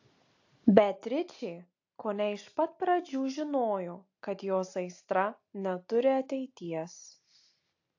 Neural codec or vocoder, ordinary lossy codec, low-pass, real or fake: none; AAC, 48 kbps; 7.2 kHz; real